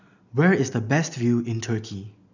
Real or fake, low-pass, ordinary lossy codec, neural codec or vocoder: real; 7.2 kHz; none; none